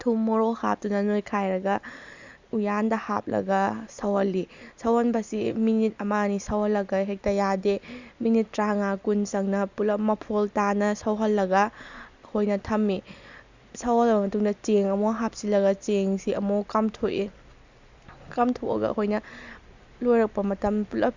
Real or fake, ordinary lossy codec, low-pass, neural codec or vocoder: real; Opus, 64 kbps; 7.2 kHz; none